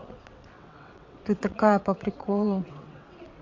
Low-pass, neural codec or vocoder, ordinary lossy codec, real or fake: 7.2 kHz; vocoder, 22.05 kHz, 80 mel bands, WaveNeXt; MP3, 48 kbps; fake